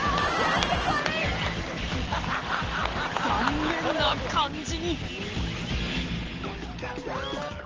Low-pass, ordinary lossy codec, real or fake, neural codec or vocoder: 7.2 kHz; Opus, 16 kbps; real; none